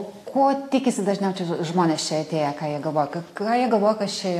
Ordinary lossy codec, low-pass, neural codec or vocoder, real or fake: AAC, 64 kbps; 14.4 kHz; none; real